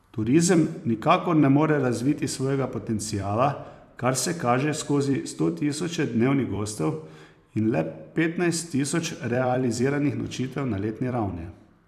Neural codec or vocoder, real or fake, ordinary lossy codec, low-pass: vocoder, 44.1 kHz, 128 mel bands every 256 samples, BigVGAN v2; fake; none; 14.4 kHz